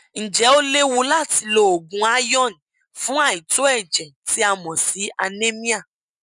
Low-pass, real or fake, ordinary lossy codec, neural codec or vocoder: 10.8 kHz; real; none; none